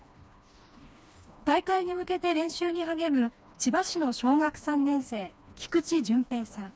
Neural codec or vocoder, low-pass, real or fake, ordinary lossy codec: codec, 16 kHz, 2 kbps, FreqCodec, smaller model; none; fake; none